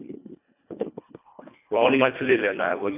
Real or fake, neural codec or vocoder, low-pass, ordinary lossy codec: fake; codec, 24 kHz, 1.5 kbps, HILCodec; 3.6 kHz; AAC, 24 kbps